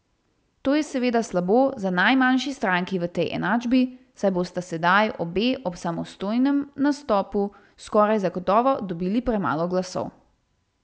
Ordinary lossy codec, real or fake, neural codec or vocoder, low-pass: none; real; none; none